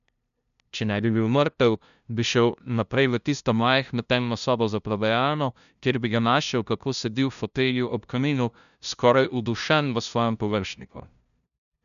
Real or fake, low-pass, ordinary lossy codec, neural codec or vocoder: fake; 7.2 kHz; none; codec, 16 kHz, 0.5 kbps, FunCodec, trained on LibriTTS, 25 frames a second